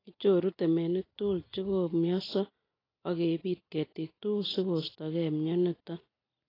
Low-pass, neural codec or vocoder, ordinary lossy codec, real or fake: 5.4 kHz; none; AAC, 24 kbps; real